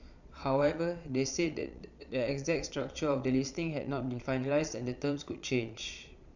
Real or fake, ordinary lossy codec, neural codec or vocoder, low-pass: fake; none; vocoder, 22.05 kHz, 80 mel bands, Vocos; 7.2 kHz